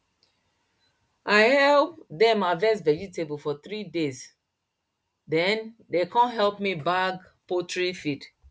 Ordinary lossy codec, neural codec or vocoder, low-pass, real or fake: none; none; none; real